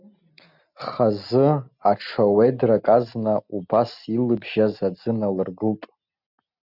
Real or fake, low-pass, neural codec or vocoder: real; 5.4 kHz; none